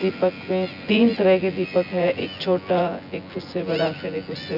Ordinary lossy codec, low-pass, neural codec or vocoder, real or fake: none; 5.4 kHz; vocoder, 24 kHz, 100 mel bands, Vocos; fake